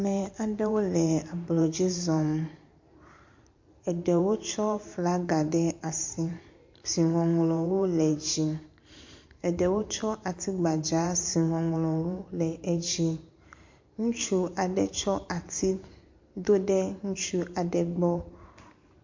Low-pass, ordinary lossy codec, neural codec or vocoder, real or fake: 7.2 kHz; MP3, 48 kbps; vocoder, 44.1 kHz, 128 mel bands every 512 samples, BigVGAN v2; fake